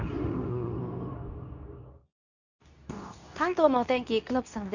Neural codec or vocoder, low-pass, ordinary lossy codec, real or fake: codec, 24 kHz, 0.9 kbps, WavTokenizer, medium speech release version 2; 7.2 kHz; none; fake